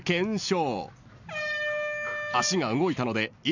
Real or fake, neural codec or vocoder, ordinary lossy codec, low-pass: real; none; none; 7.2 kHz